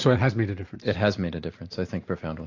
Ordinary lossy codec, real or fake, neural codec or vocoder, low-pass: AAC, 48 kbps; real; none; 7.2 kHz